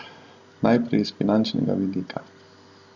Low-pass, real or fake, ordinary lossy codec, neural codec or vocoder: 7.2 kHz; real; Opus, 64 kbps; none